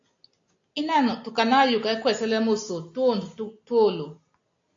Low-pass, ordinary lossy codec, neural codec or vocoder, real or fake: 7.2 kHz; AAC, 48 kbps; none; real